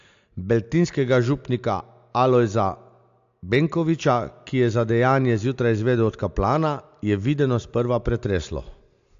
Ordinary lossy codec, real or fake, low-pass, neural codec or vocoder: AAC, 64 kbps; real; 7.2 kHz; none